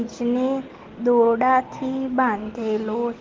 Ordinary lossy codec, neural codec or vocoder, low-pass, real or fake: Opus, 16 kbps; none; 7.2 kHz; real